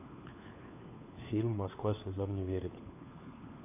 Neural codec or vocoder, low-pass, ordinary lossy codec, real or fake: codec, 16 kHz, 4 kbps, FunCodec, trained on LibriTTS, 50 frames a second; 3.6 kHz; AAC, 32 kbps; fake